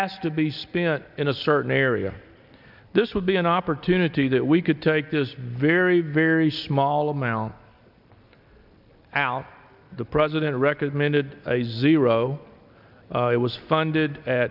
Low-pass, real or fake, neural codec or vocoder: 5.4 kHz; real; none